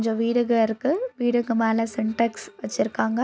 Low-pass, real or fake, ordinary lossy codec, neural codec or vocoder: none; real; none; none